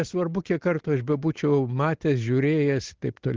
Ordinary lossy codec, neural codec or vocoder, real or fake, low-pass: Opus, 16 kbps; none; real; 7.2 kHz